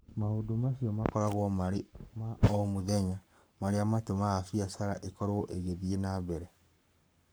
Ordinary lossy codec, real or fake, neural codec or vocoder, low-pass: none; fake; codec, 44.1 kHz, 7.8 kbps, Pupu-Codec; none